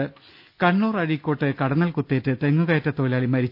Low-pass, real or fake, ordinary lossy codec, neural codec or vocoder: 5.4 kHz; real; none; none